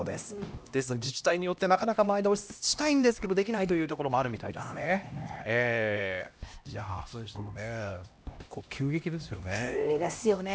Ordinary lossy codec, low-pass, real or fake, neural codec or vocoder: none; none; fake; codec, 16 kHz, 1 kbps, X-Codec, HuBERT features, trained on LibriSpeech